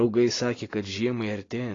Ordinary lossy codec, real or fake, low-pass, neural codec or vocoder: AAC, 32 kbps; real; 7.2 kHz; none